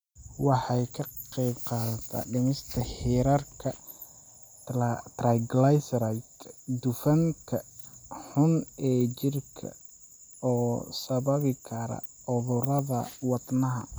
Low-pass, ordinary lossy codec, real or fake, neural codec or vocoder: none; none; real; none